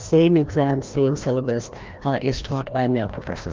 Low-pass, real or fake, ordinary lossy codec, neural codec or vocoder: 7.2 kHz; fake; Opus, 24 kbps; codec, 16 kHz, 1 kbps, FreqCodec, larger model